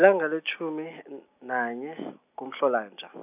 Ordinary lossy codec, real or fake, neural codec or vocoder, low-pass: none; real; none; 3.6 kHz